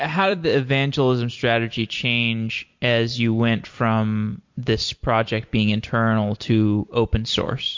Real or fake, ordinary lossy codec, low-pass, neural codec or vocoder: fake; MP3, 48 kbps; 7.2 kHz; vocoder, 44.1 kHz, 128 mel bands every 256 samples, BigVGAN v2